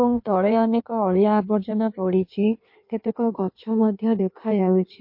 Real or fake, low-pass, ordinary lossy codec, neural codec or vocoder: fake; 5.4 kHz; MP3, 32 kbps; codec, 16 kHz in and 24 kHz out, 1.1 kbps, FireRedTTS-2 codec